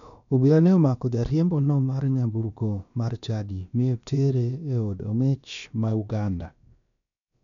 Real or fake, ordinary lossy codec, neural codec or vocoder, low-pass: fake; none; codec, 16 kHz, about 1 kbps, DyCAST, with the encoder's durations; 7.2 kHz